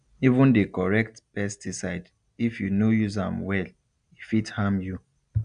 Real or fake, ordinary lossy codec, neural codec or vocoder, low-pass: real; AAC, 96 kbps; none; 9.9 kHz